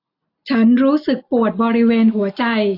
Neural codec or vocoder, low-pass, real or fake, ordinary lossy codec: none; 5.4 kHz; real; AAC, 32 kbps